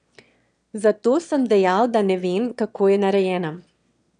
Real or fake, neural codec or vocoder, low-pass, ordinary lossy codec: fake; autoencoder, 22.05 kHz, a latent of 192 numbers a frame, VITS, trained on one speaker; 9.9 kHz; none